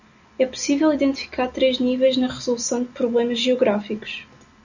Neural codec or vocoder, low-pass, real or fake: none; 7.2 kHz; real